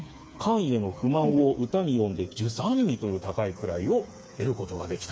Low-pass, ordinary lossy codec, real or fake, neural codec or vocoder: none; none; fake; codec, 16 kHz, 4 kbps, FreqCodec, smaller model